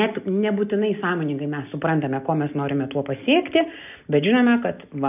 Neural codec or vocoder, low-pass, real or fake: none; 3.6 kHz; real